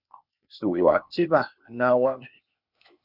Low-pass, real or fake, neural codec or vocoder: 5.4 kHz; fake; codec, 16 kHz, 0.8 kbps, ZipCodec